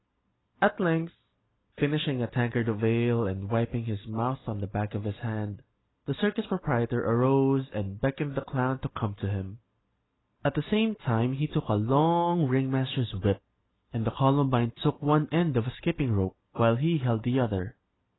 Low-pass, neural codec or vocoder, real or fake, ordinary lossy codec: 7.2 kHz; none; real; AAC, 16 kbps